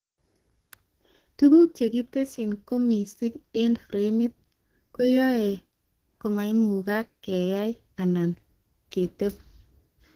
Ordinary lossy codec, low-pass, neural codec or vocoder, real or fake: Opus, 16 kbps; 14.4 kHz; codec, 32 kHz, 1.9 kbps, SNAC; fake